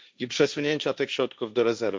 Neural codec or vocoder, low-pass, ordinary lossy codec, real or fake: codec, 16 kHz, 1.1 kbps, Voila-Tokenizer; none; none; fake